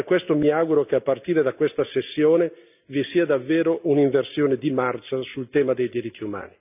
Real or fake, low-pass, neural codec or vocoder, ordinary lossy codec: real; 3.6 kHz; none; none